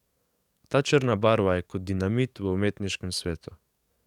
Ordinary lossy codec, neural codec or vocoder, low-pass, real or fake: none; codec, 44.1 kHz, 7.8 kbps, DAC; 19.8 kHz; fake